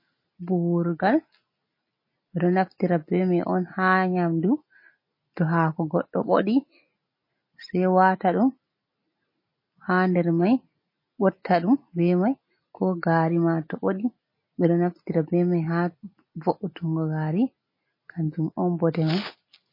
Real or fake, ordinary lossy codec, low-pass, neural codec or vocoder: real; MP3, 24 kbps; 5.4 kHz; none